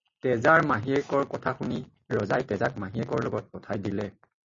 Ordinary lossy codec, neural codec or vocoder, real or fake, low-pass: MP3, 32 kbps; none; real; 7.2 kHz